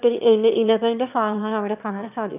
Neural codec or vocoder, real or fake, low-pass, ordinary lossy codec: autoencoder, 22.05 kHz, a latent of 192 numbers a frame, VITS, trained on one speaker; fake; 3.6 kHz; none